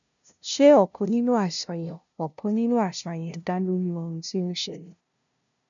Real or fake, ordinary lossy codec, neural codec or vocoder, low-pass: fake; none; codec, 16 kHz, 0.5 kbps, FunCodec, trained on LibriTTS, 25 frames a second; 7.2 kHz